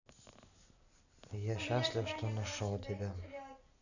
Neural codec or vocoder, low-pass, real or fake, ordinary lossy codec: none; 7.2 kHz; real; none